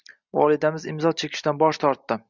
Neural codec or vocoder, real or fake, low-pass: none; real; 7.2 kHz